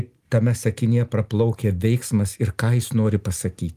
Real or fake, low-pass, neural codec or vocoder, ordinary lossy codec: real; 14.4 kHz; none; Opus, 24 kbps